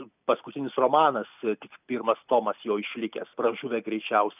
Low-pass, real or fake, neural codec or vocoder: 3.6 kHz; real; none